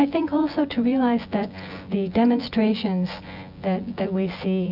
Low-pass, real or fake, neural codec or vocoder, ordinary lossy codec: 5.4 kHz; fake; vocoder, 24 kHz, 100 mel bands, Vocos; AAC, 48 kbps